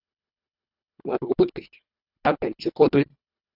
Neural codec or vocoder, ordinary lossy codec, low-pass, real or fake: codec, 24 kHz, 1.5 kbps, HILCodec; AAC, 32 kbps; 5.4 kHz; fake